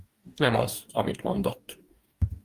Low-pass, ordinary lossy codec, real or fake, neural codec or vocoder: 14.4 kHz; Opus, 32 kbps; fake; codec, 44.1 kHz, 2.6 kbps, DAC